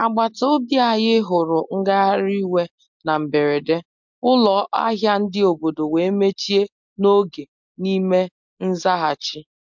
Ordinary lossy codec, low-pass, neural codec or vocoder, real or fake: MP3, 64 kbps; 7.2 kHz; none; real